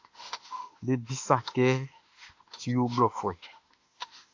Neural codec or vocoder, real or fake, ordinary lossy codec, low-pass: autoencoder, 48 kHz, 32 numbers a frame, DAC-VAE, trained on Japanese speech; fake; AAC, 48 kbps; 7.2 kHz